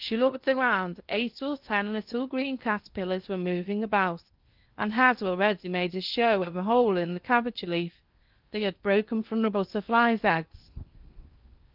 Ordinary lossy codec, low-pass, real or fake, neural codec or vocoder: Opus, 24 kbps; 5.4 kHz; fake; codec, 16 kHz in and 24 kHz out, 0.6 kbps, FocalCodec, streaming, 2048 codes